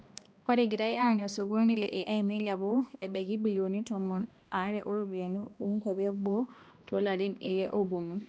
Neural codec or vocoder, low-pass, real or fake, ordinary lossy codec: codec, 16 kHz, 1 kbps, X-Codec, HuBERT features, trained on balanced general audio; none; fake; none